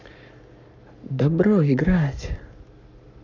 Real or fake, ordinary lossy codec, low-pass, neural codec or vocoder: fake; none; 7.2 kHz; codec, 44.1 kHz, 7.8 kbps, Pupu-Codec